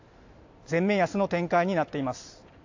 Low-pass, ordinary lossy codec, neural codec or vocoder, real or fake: 7.2 kHz; AAC, 48 kbps; none; real